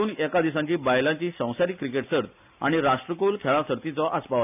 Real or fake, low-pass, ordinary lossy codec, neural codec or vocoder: real; 3.6 kHz; none; none